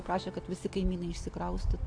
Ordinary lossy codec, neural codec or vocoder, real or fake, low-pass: AAC, 48 kbps; none; real; 9.9 kHz